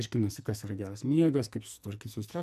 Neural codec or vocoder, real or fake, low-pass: codec, 44.1 kHz, 2.6 kbps, SNAC; fake; 14.4 kHz